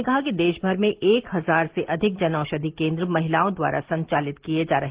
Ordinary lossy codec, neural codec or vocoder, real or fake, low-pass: Opus, 16 kbps; none; real; 3.6 kHz